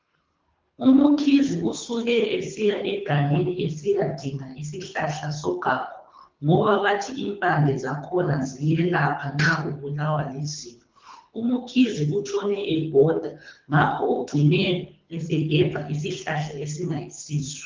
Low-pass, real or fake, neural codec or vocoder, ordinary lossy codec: 7.2 kHz; fake; codec, 24 kHz, 3 kbps, HILCodec; Opus, 24 kbps